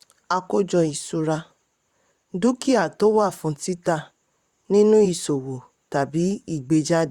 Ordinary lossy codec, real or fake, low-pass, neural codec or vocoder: Opus, 64 kbps; fake; 19.8 kHz; vocoder, 44.1 kHz, 128 mel bands, Pupu-Vocoder